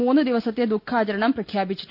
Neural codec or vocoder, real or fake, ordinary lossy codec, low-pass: none; real; none; 5.4 kHz